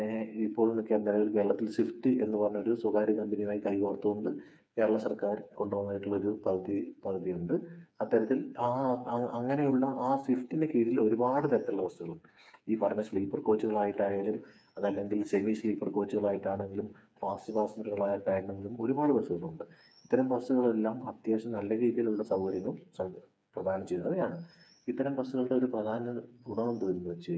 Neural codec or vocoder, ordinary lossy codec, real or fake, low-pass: codec, 16 kHz, 4 kbps, FreqCodec, smaller model; none; fake; none